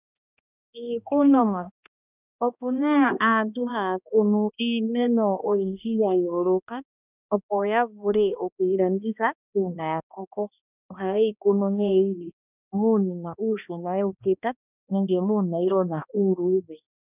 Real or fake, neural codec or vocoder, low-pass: fake; codec, 16 kHz, 1 kbps, X-Codec, HuBERT features, trained on balanced general audio; 3.6 kHz